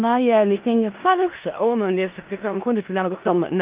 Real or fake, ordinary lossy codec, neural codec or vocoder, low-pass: fake; Opus, 32 kbps; codec, 16 kHz in and 24 kHz out, 0.4 kbps, LongCat-Audio-Codec, four codebook decoder; 3.6 kHz